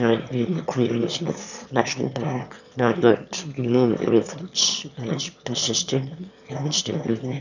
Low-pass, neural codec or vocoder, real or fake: 7.2 kHz; autoencoder, 22.05 kHz, a latent of 192 numbers a frame, VITS, trained on one speaker; fake